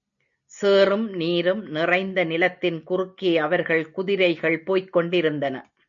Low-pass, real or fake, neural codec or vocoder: 7.2 kHz; real; none